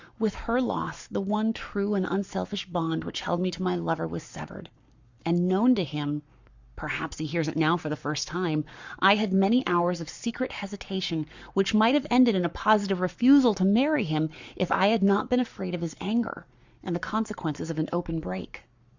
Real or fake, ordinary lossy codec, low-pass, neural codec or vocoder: fake; Opus, 64 kbps; 7.2 kHz; codec, 44.1 kHz, 7.8 kbps, Pupu-Codec